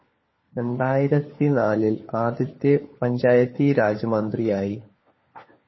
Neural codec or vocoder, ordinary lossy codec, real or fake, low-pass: codec, 16 kHz, 4 kbps, FunCodec, trained on LibriTTS, 50 frames a second; MP3, 24 kbps; fake; 7.2 kHz